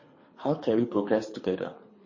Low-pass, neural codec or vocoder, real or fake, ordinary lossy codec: 7.2 kHz; codec, 24 kHz, 3 kbps, HILCodec; fake; MP3, 32 kbps